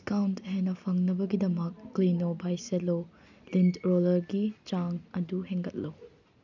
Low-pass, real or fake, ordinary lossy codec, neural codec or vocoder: 7.2 kHz; real; none; none